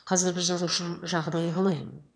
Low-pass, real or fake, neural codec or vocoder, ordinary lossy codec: 9.9 kHz; fake; autoencoder, 22.05 kHz, a latent of 192 numbers a frame, VITS, trained on one speaker; none